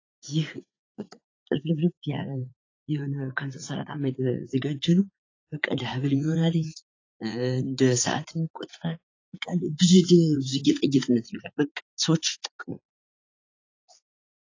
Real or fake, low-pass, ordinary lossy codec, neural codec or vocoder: fake; 7.2 kHz; AAC, 32 kbps; vocoder, 44.1 kHz, 80 mel bands, Vocos